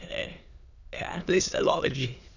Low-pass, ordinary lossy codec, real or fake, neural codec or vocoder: 7.2 kHz; none; fake; autoencoder, 22.05 kHz, a latent of 192 numbers a frame, VITS, trained on many speakers